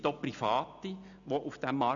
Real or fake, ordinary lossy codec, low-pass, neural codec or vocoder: real; none; 7.2 kHz; none